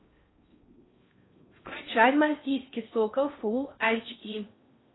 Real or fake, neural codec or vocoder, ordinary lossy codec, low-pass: fake; codec, 16 kHz in and 24 kHz out, 0.6 kbps, FocalCodec, streaming, 4096 codes; AAC, 16 kbps; 7.2 kHz